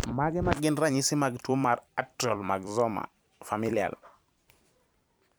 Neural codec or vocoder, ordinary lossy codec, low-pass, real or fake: vocoder, 44.1 kHz, 128 mel bands every 256 samples, BigVGAN v2; none; none; fake